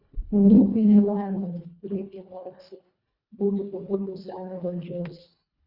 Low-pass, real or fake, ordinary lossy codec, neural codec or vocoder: 5.4 kHz; fake; Opus, 64 kbps; codec, 24 kHz, 1.5 kbps, HILCodec